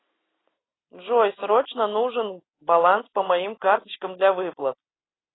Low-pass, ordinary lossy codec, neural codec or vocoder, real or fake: 7.2 kHz; AAC, 16 kbps; none; real